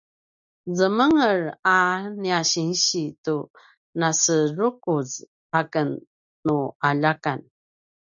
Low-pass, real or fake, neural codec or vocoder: 7.2 kHz; real; none